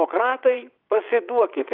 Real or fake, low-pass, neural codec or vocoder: fake; 5.4 kHz; vocoder, 22.05 kHz, 80 mel bands, WaveNeXt